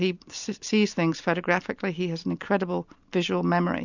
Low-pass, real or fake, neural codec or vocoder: 7.2 kHz; real; none